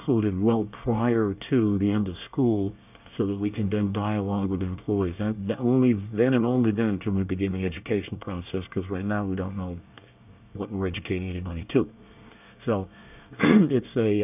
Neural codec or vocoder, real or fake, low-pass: codec, 24 kHz, 1 kbps, SNAC; fake; 3.6 kHz